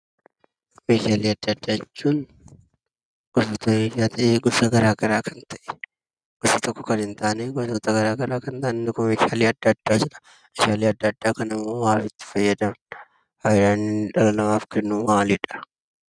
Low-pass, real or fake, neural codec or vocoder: 9.9 kHz; real; none